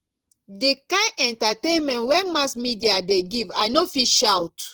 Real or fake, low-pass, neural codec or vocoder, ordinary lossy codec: fake; 19.8 kHz; vocoder, 44.1 kHz, 128 mel bands, Pupu-Vocoder; Opus, 16 kbps